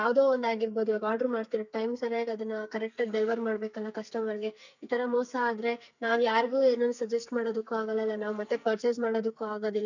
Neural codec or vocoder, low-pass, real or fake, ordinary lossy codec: codec, 44.1 kHz, 2.6 kbps, SNAC; 7.2 kHz; fake; none